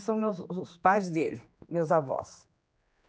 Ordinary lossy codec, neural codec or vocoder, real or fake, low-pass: none; codec, 16 kHz, 2 kbps, X-Codec, HuBERT features, trained on general audio; fake; none